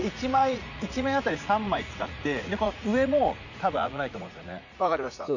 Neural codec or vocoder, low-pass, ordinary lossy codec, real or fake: vocoder, 44.1 kHz, 80 mel bands, Vocos; 7.2 kHz; none; fake